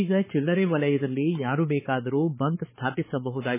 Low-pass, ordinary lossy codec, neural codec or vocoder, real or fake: 3.6 kHz; MP3, 16 kbps; codec, 16 kHz, 4 kbps, X-Codec, HuBERT features, trained on balanced general audio; fake